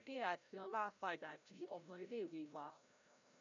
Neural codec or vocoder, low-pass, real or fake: codec, 16 kHz, 0.5 kbps, FreqCodec, larger model; 7.2 kHz; fake